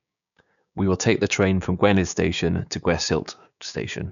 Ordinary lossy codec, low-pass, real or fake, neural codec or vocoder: none; 7.2 kHz; fake; codec, 16 kHz, 6 kbps, DAC